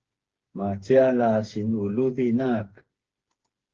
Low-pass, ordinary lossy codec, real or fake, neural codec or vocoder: 7.2 kHz; Opus, 16 kbps; fake; codec, 16 kHz, 4 kbps, FreqCodec, smaller model